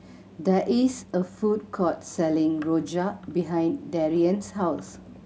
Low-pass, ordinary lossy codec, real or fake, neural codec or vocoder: none; none; real; none